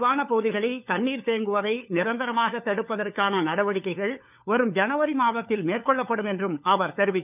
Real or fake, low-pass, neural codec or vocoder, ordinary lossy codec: fake; 3.6 kHz; codec, 16 kHz, 4 kbps, FunCodec, trained on LibriTTS, 50 frames a second; none